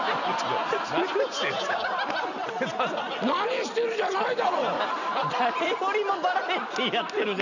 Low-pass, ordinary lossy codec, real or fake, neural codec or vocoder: 7.2 kHz; none; real; none